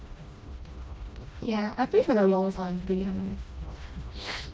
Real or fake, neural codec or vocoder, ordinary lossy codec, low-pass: fake; codec, 16 kHz, 1 kbps, FreqCodec, smaller model; none; none